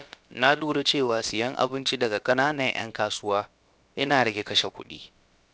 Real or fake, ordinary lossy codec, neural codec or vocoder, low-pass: fake; none; codec, 16 kHz, about 1 kbps, DyCAST, with the encoder's durations; none